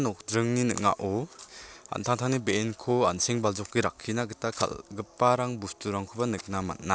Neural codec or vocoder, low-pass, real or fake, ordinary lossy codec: none; none; real; none